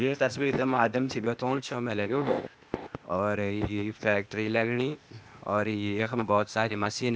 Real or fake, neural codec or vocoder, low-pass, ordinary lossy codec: fake; codec, 16 kHz, 0.8 kbps, ZipCodec; none; none